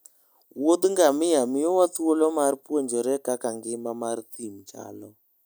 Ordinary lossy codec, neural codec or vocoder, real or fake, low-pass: none; none; real; none